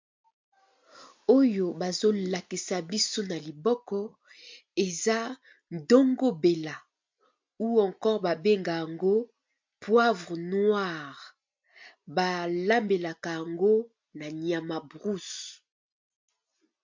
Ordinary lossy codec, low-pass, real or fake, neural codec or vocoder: MP3, 48 kbps; 7.2 kHz; real; none